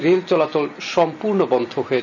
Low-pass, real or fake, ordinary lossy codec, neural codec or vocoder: 7.2 kHz; real; none; none